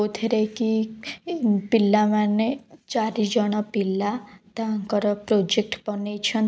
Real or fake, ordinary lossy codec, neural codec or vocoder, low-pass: real; none; none; none